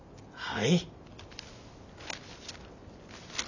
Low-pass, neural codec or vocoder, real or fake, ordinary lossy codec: 7.2 kHz; none; real; none